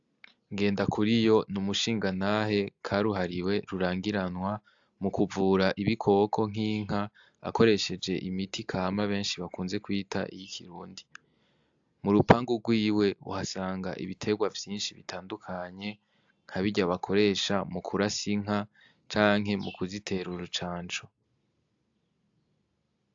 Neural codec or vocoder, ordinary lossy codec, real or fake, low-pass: none; AAC, 64 kbps; real; 7.2 kHz